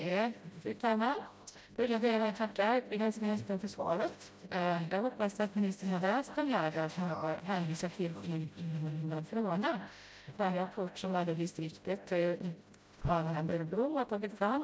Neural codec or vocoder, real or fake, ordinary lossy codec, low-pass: codec, 16 kHz, 0.5 kbps, FreqCodec, smaller model; fake; none; none